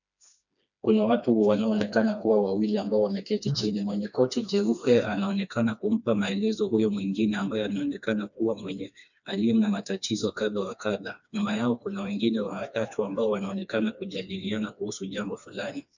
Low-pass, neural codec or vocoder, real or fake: 7.2 kHz; codec, 16 kHz, 2 kbps, FreqCodec, smaller model; fake